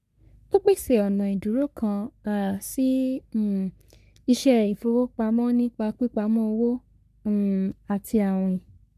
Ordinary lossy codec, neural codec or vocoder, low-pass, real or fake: none; codec, 44.1 kHz, 3.4 kbps, Pupu-Codec; 14.4 kHz; fake